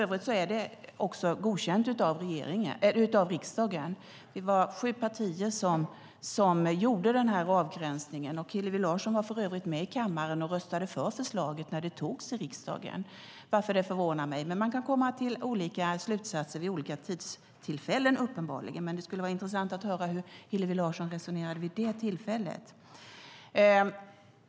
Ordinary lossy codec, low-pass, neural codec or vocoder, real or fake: none; none; none; real